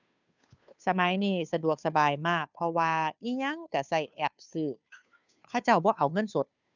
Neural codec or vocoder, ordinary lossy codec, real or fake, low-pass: codec, 16 kHz, 2 kbps, FunCodec, trained on Chinese and English, 25 frames a second; none; fake; 7.2 kHz